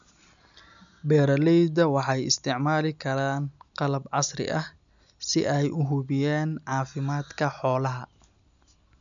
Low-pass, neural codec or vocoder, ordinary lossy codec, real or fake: 7.2 kHz; none; none; real